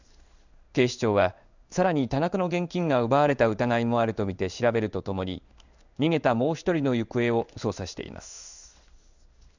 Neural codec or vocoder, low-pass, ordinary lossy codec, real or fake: codec, 16 kHz in and 24 kHz out, 1 kbps, XY-Tokenizer; 7.2 kHz; none; fake